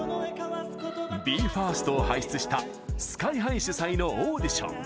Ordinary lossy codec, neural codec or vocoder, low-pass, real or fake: none; none; none; real